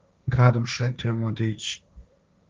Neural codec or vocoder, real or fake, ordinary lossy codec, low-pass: codec, 16 kHz, 1.1 kbps, Voila-Tokenizer; fake; Opus, 24 kbps; 7.2 kHz